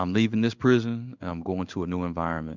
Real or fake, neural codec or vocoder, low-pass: fake; codec, 16 kHz in and 24 kHz out, 1 kbps, XY-Tokenizer; 7.2 kHz